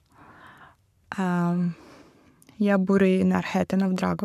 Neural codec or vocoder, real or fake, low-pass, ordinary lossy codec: codec, 44.1 kHz, 7.8 kbps, Pupu-Codec; fake; 14.4 kHz; none